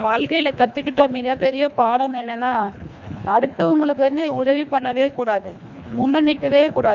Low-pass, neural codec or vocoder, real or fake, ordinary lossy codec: 7.2 kHz; codec, 24 kHz, 1.5 kbps, HILCodec; fake; none